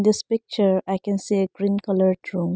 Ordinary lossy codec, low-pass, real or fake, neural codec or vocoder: none; none; real; none